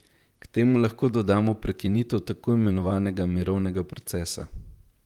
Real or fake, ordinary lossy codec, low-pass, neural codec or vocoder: fake; Opus, 32 kbps; 19.8 kHz; vocoder, 44.1 kHz, 128 mel bands, Pupu-Vocoder